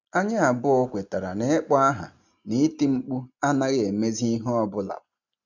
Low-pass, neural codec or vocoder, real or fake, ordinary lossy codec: 7.2 kHz; none; real; none